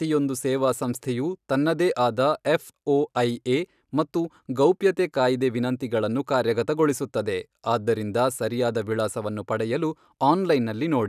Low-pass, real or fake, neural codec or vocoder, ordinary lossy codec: 14.4 kHz; real; none; none